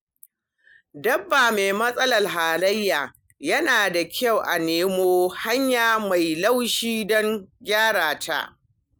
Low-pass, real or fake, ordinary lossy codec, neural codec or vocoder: none; real; none; none